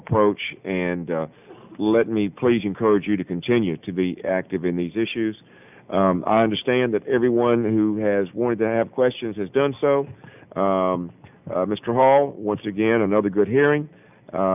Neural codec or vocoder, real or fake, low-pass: none; real; 3.6 kHz